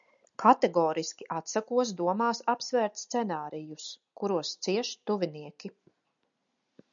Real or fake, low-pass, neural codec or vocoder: real; 7.2 kHz; none